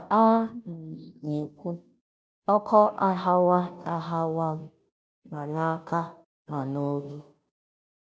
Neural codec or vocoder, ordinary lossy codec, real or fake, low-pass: codec, 16 kHz, 0.5 kbps, FunCodec, trained on Chinese and English, 25 frames a second; none; fake; none